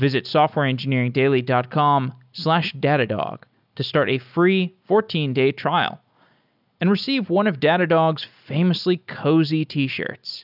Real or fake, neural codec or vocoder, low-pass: real; none; 5.4 kHz